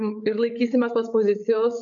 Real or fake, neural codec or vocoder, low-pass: fake; codec, 16 kHz, 8 kbps, FreqCodec, larger model; 7.2 kHz